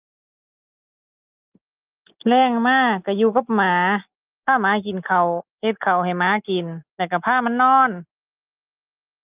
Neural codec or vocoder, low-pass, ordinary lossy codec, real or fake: none; 3.6 kHz; Opus, 24 kbps; real